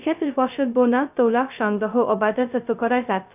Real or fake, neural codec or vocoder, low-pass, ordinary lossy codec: fake; codec, 16 kHz, 0.2 kbps, FocalCodec; 3.6 kHz; none